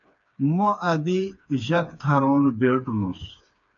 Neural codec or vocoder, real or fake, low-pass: codec, 16 kHz, 4 kbps, FreqCodec, smaller model; fake; 7.2 kHz